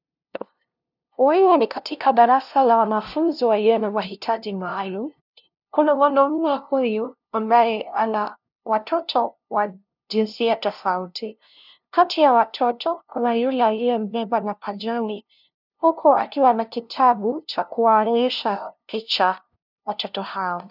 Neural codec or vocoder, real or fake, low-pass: codec, 16 kHz, 0.5 kbps, FunCodec, trained on LibriTTS, 25 frames a second; fake; 5.4 kHz